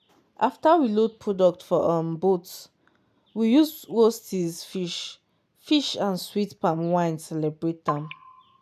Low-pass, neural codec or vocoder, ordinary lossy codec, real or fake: 14.4 kHz; none; none; real